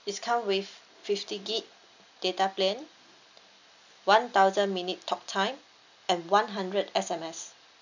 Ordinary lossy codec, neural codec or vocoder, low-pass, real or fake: none; none; 7.2 kHz; real